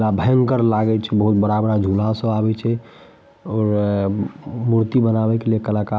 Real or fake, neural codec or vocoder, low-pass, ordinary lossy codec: real; none; none; none